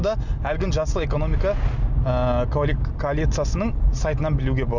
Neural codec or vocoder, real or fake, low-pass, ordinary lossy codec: none; real; 7.2 kHz; none